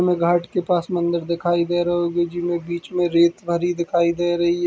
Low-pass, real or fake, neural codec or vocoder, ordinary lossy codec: none; real; none; none